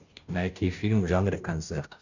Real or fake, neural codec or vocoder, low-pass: fake; codec, 16 kHz, 0.5 kbps, FunCodec, trained on Chinese and English, 25 frames a second; 7.2 kHz